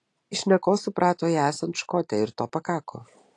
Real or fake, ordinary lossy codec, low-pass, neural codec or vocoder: real; AAC, 48 kbps; 10.8 kHz; none